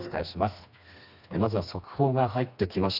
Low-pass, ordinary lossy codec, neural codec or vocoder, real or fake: 5.4 kHz; none; codec, 16 kHz, 2 kbps, FreqCodec, smaller model; fake